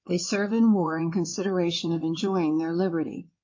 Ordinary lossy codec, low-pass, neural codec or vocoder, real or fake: MP3, 48 kbps; 7.2 kHz; codec, 16 kHz in and 24 kHz out, 2.2 kbps, FireRedTTS-2 codec; fake